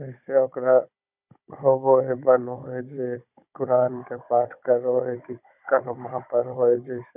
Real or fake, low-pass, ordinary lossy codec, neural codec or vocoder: fake; 3.6 kHz; none; codec, 16 kHz, 16 kbps, FunCodec, trained on Chinese and English, 50 frames a second